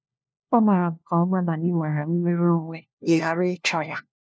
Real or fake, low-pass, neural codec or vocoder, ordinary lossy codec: fake; none; codec, 16 kHz, 1 kbps, FunCodec, trained on LibriTTS, 50 frames a second; none